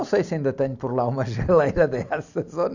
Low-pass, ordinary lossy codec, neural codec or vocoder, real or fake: 7.2 kHz; none; none; real